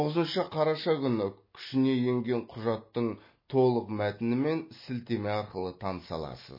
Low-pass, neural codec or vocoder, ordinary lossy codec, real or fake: 5.4 kHz; none; MP3, 24 kbps; real